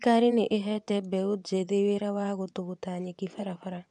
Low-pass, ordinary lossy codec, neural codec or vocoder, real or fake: 10.8 kHz; none; vocoder, 24 kHz, 100 mel bands, Vocos; fake